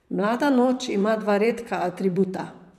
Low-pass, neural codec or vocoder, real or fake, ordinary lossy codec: 14.4 kHz; vocoder, 44.1 kHz, 128 mel bands, Pupu-Vocoder; fake; none